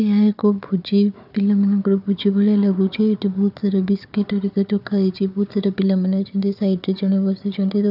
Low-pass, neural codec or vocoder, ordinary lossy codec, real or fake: 5.4 kHz; codec, 16 kHz, 4 kbps, FunCodec, trained on Chinese and English, 50 frames a second; none; fake